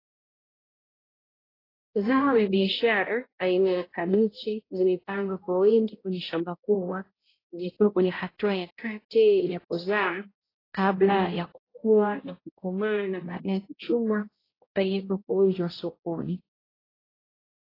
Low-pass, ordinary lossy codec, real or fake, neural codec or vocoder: 5.4 kHz; AAC, 24 kbps; fake; codec, 16 kHz, 0.5 kbps, X-Codec, HuBERT features, trained on balanced general audio